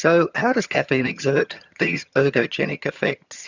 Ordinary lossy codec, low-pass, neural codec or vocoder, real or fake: Opus, 64 kbps; 7.2 kHz; vocoder, 22.05 kHz, 80 mel bands, HiFi-GAN; fake